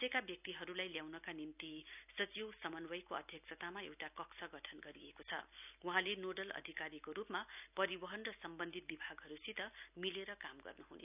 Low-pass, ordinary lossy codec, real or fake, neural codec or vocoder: 3.6 kHz; none; real; none